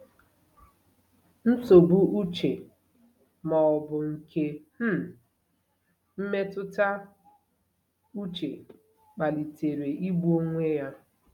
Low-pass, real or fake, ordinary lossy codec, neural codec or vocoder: 19.8 kHz; real; none; none